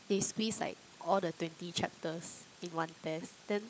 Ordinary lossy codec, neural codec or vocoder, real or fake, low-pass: none; codec, 16 kHz, 16 kbps, FunCodec, trained on LibriTTS, 50 frames a second; fake; none